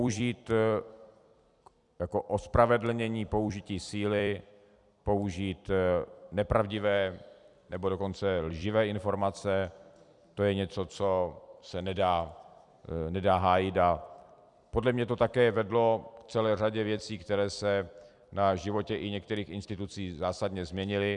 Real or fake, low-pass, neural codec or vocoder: fake; 10.8 kHz; vocoder, 44.1 kHz, 128 mel bands every 256 samples, BigVGAN v2